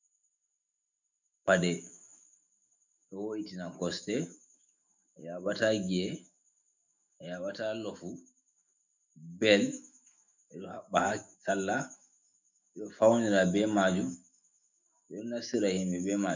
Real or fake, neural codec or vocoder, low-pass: fake; autoencoder, 48 kHz, 128 numbers a frame, DAC-VAE, trained on Japanese speech; 7.2 kHz